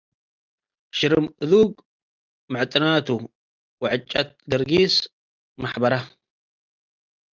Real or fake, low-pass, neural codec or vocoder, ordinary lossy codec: real; 7.2 kHz; none; Opus, 24 kbps